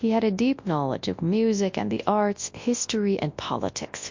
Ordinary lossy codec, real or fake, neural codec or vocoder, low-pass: MP3, 48 kbps; fake; codec, 24 kHz, 0.9 kbps, WavTokenizer, large speech release; 7.2 kHz